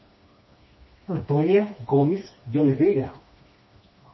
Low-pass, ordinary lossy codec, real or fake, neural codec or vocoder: 7.2 kHz; MP3, 24 kbps; fake; codec, 16 kHz, 2 kbps, FreqCodec, smaller model